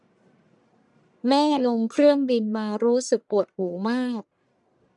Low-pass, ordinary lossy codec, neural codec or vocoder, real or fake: 10.8 kHz; none; codec, 44.1 kHz, 1.7 kbps, Pupu-Codec; fake